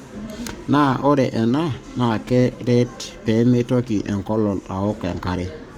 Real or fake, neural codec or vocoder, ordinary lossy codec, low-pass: fake; codec, 44.1 kHz, 7.8 kbps, Pupu-Codec; Opus, 64 kbps; 19.8 kHz